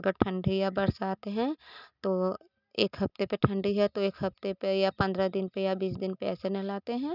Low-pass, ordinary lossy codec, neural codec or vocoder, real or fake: 5.4 kHz; none; none; real